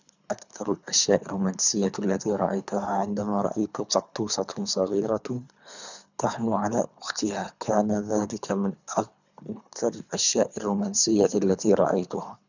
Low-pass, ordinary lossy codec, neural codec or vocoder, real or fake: 7.2 kHz; none; codec, 24 kHz, 3 kbps, HILCodec; fake